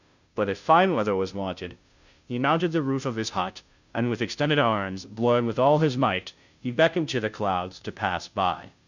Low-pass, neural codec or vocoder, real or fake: 7.2 kHz; codec, 16 kHz, 0.5 kbps, FunCodec, trained on Chinese and English, 25 frames a second; fake